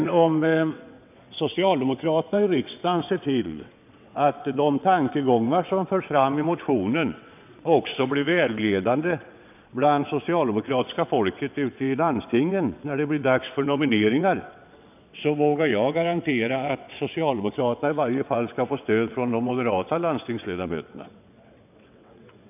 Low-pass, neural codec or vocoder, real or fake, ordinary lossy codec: 3.6 kHz; vocoder, 22.05 kHz, 80 mel bands, WaveNeXt; fake; none